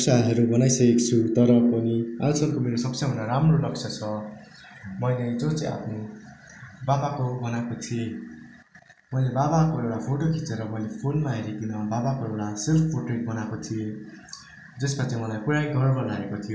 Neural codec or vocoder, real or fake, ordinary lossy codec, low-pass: none; real; none; none